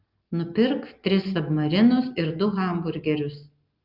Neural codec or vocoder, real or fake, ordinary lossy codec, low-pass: none; real; Opus, 24 kbps; 5.4 kHz